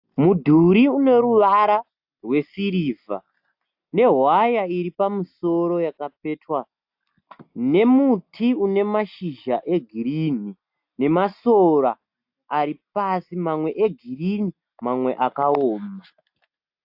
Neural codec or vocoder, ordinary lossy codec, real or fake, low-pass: none; AAC, 48 kbps; real; 5.4 kHz